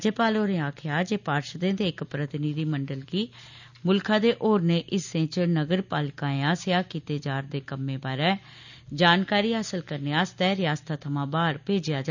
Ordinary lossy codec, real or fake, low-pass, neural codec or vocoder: none; real; 7.2 kHz; none